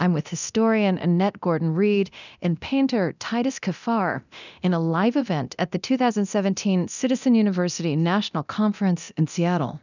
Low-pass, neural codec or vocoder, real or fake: 7.2 kHz; codec, 24 kHz, 0.9 kbps, DualCodec; fake